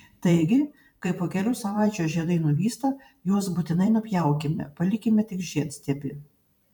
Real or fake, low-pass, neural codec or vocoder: fake; 19.8 kHz; vocoder, 44.1 kHz, 128 mel bands every 512 samples, BigVGAN v2